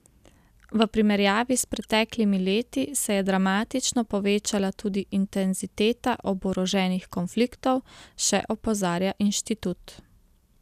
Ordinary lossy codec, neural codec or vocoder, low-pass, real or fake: none; none; 14.4 kHz; real